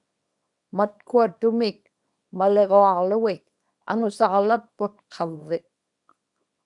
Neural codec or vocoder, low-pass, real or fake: codec, 24 kHz, 0.9 kbps, WavTokenizer, small release; 10.8 kHz; fake